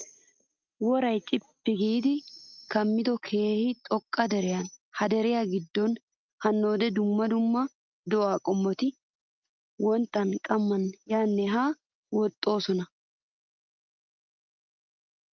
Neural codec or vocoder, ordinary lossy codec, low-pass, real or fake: none; Opus, 24 kbps; 7.2 kHz; real